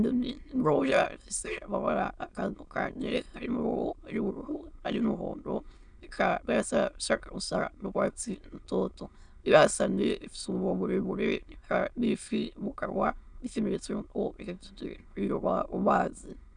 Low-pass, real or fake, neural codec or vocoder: 9.9 kHz; fake; autoencoder, 22.05 kHz, a latent of 192 numbers a frame, VITS, trained on many speakers